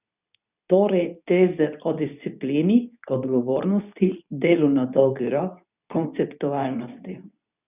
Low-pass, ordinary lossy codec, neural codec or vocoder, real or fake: 3.6 kHz; none; codec, 24 kHz, 0.9 kbps, WavTokenizer, medium speech release version 2; fake